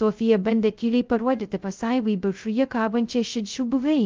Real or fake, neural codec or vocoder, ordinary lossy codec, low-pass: fake; codec, 16 kHz, 0.2 kbps, FocalCodec; Opus, 24 kbps; 7.2 kHz